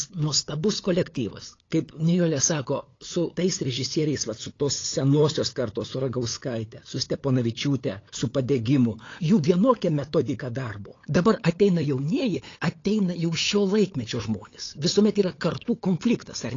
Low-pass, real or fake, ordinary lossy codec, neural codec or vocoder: 7.2 kHz; fake; AAC, 32 kbps; codec, 16 kHz, 8 kbps, FunCodec, trained on LibriTTS, 25 frames a second